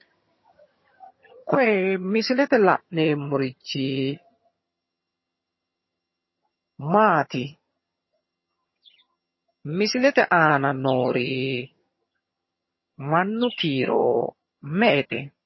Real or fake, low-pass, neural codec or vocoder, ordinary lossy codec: fake; 7.2 kHz; vocoder, 22.05 kHz, 80 mel bands, HiFi-GAN; MP3, 24 kbps